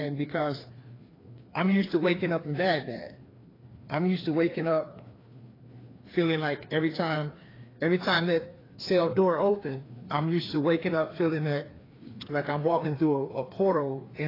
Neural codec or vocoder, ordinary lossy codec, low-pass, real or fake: codec, 16 kHz, 2 kbps, FreqCodec, larger model; AAC, 24 kbps; 5.4 kHz; fake